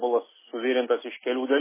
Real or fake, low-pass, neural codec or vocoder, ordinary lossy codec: real; 3.6 kHz; none; MP3, 16 kbps